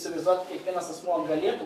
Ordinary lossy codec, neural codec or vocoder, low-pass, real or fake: AAC, 64 kbps; codec, 44.1 kHz, 7.8 kbps, DAC; 14.4 kHz; fake